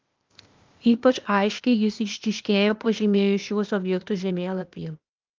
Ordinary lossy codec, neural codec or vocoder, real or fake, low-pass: Opus, 32 kbps; codec, 16 kHz, 0.8 kbps, ZipCodec; fake; 7.2 kHz